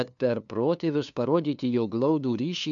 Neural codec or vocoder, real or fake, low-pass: codec, 16 kHz, 2 kbps, FunCodec, trained on LibriTTS, 25 frames a second; fake; 7.2 kHz